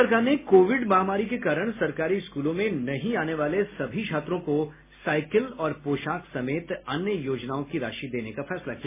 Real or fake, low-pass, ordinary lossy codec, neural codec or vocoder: real; 3.6 kHz; MP3, 16 kbps; none